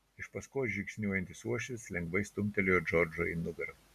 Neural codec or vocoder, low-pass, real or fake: none; 14.4 kHz; real